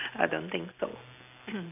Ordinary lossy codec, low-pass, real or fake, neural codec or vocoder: none; 3.6 kHz; real; none